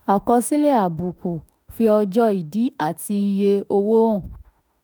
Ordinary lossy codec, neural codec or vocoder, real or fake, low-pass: none; autoencoder, 48 kHz, 32 numbers a frame, DAC-VAE, trained on Japanese speech; fake; none